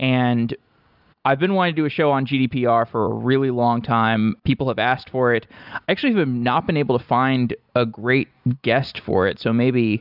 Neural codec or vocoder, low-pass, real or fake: none; 5.4 kHz; real